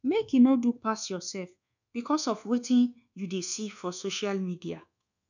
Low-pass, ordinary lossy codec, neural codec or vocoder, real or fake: 7.2 kHz; none; codec, 24 kHz, 1.2 kbps, DualCodec; fake